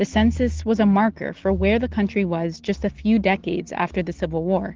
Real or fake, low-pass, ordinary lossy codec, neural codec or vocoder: real; 7.2 kHz; Opus, 16 kbps; none